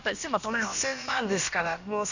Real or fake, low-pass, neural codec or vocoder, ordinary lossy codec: fake; 7.2 kHz; codec, 16 kHz, about 1 kbps, DyCAST, with the encoder's durations; none